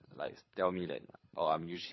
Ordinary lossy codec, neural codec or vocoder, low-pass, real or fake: MP3, 24 kbps; codec, 24 kHz, 6 kbps, HILCodec; 7.2 kHz; fake